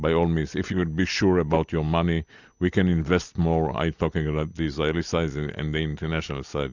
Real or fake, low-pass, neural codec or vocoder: real; 7.2 kHz; none